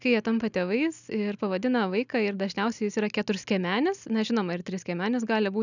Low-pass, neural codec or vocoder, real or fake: 7.2 kHz; none; real